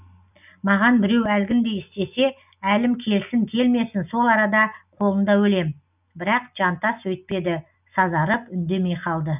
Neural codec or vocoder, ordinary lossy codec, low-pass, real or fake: none; none; 3.6 kHz; real